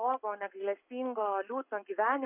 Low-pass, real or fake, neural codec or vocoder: 3.6 kHz; fake; codec, 44.1 kHz, 7.8 kbps, Pupu-Codec